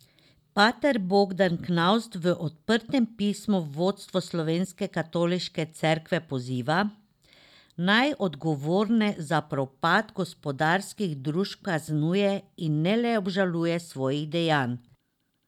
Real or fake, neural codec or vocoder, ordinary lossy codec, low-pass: real; none; none; 19.8 kHz